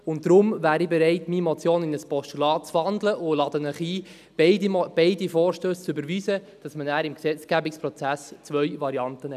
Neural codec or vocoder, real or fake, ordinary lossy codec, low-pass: none; real; none; 14.4 kHz